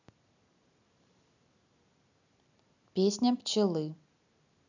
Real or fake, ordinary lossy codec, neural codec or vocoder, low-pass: real; none; none; 7.2 kHz